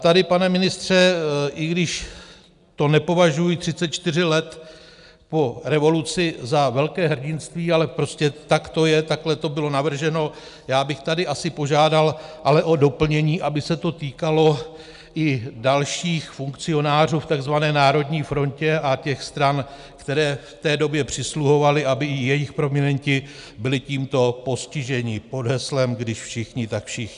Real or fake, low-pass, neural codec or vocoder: real; 10.8 kHz; none